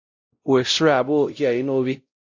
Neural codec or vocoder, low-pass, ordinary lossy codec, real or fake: codec, 16 kHz, 0.5 kbps, X-Codec, WavLM features, trained on Multilingual LibriSpeech; 7.2 kHz; AAC, 48 kbps; fake